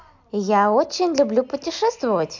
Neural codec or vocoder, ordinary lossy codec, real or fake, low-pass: none; MP3, 64 kbps; real; 7.2 kHz